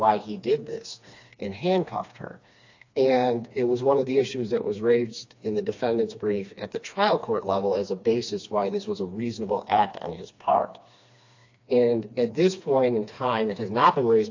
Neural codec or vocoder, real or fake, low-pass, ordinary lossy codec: codec, 16 kHz, 2 kbps, FreqCodec, smaller model; fake; 7.2 kHz; AAC, 48 kbps